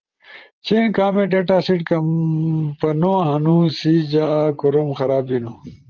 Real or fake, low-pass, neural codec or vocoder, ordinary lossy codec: fake; 7.2 kHz; vocoder, 44.1 kHz, 128 mel bands, Pupu-Vocoder; Opus, 32 kbps